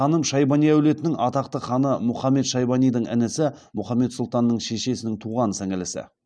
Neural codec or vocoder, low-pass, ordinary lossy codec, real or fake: none; none; none; real